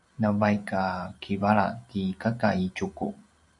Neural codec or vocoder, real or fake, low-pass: none; real; 10.8 kHz